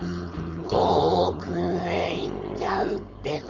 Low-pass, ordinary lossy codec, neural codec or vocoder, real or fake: 7.2 kHz; none; codec, 16 kHz, 4.8 kbps, FACodec; fake